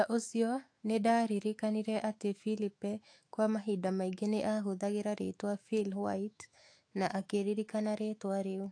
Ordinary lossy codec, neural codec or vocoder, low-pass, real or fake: AAC, 48 kbps; autoencoder, 48 kHz, 128 numbers a frame, DAC-VAE, trained on Japanese speech; 9.9 kHz; fake